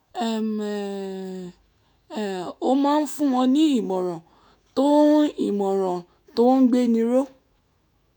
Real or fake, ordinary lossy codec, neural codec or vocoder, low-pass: fake; none; autoencoder, 48 kHz, 128 numbers a frame, DAC-VAE, trained on Japanese speech; 19.8 kHz